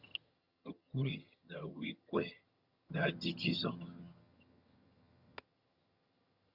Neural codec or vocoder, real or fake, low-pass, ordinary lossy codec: vocoder, 22.05 kHz, 80 mel bands, HiFi-GAN; fake; 5.4 kHz; Opus, 64 kbps